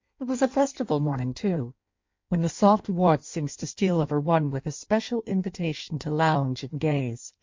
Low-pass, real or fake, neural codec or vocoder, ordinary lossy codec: 7.2 kHz; fake; codec, 16 kHz in and 24 kHz out, 1.1 kbps, FireRedTTS-2 codec; MP3, 48 kbps